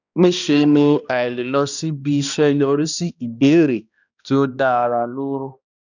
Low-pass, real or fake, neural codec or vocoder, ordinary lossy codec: 7.2 kHz; fake; codec, 16 kHz, 1 kbps, X-Codec, HuBERT features, trained on balanced general audio; none